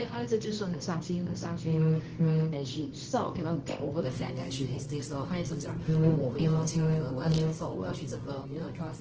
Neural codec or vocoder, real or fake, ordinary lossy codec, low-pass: codec, 24 kHz, 0.9 kbps, WavTokenizer, medium music audio release; fake; Opus, 16 kbps; 7.2 kHz